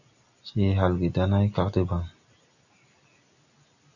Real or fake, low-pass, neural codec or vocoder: real; 7.2 kHz; none